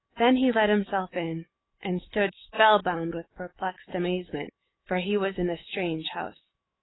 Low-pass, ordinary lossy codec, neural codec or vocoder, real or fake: 7.2 kHz; AAC, 16 kbps; autoencoder, 48 kHz, 128 numbers a frame, DAC-VAE, trained on Japanese speech; fake